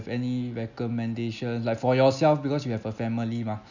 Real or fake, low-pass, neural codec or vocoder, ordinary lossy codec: real; 7.2 kHz; none; none